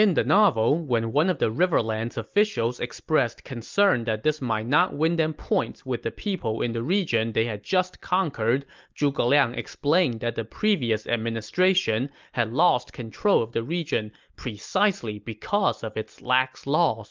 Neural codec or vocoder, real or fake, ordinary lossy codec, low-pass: none; real; Opus, 24 kbps; 7.2 kHz